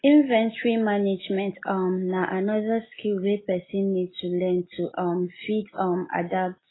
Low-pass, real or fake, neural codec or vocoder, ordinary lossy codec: 7.2 kHz; real; none; AAC, 16 kbps